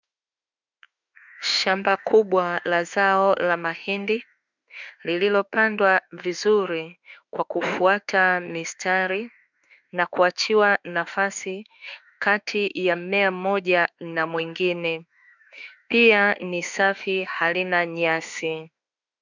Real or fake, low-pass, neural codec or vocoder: fake; 7.2 kHz; autoencoder, 48 kHz, 32 numbers a frame, DAC-VAE, trained on Japanese speech